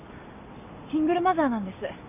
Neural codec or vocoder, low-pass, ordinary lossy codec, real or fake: none; 3.6 kHz; none; real